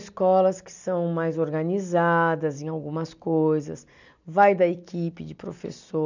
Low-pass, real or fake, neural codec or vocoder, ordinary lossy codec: 7.2 kHz; real; none; none